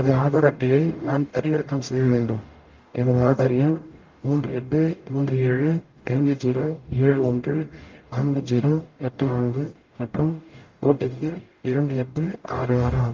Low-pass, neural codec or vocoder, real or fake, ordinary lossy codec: 7.2 kHz; codec, 44.1 kHz, 0.9 kbps, DAC; fake; Opus, 32 kbps